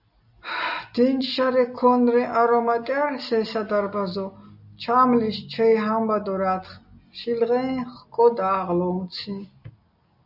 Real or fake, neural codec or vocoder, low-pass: real; none; 5.4 kHz